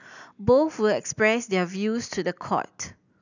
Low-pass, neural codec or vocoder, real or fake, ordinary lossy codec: 7.2 kHz; none; real; none